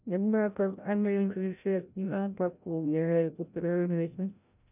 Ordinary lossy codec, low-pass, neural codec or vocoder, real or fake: none; 3.6 kHz; codec, 16 kHz, 0.5 kbps, FreqCodec, larger model; fake